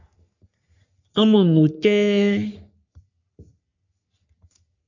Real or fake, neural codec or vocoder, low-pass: fake; codec, 44.1 kHz, 3.4 kbps, Pupu-Codec; 7.2 kHz